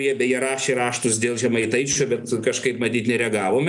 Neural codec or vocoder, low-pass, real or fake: none; 10.8 kHz; real